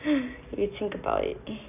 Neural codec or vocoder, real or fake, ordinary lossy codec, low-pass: none; real; none; 3.6 kHz